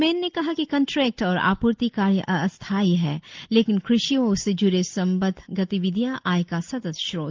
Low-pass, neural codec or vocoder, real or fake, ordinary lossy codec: 7.2 kHz; none; real; Opus, 24 kbps